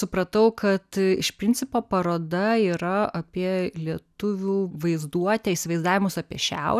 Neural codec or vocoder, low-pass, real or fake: none; 14.4 kHz; real